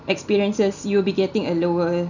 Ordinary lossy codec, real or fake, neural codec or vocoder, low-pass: none; real; none; 7.2 kHz